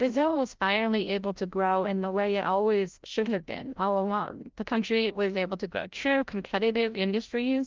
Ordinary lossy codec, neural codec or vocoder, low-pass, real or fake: Opus, 32 kbps; codec, 16 kHz, 0.5 kbps, FreqCodec, larger model; 7.2 kHz; fake